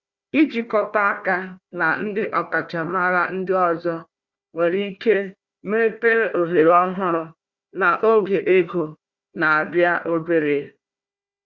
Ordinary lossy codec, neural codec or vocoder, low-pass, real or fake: Opus, 64 kbps; codec, 16 kHz, 1 kbps, FunCodec, trained on Chinese and English, 50 frames a second; 7.2 kHz; fake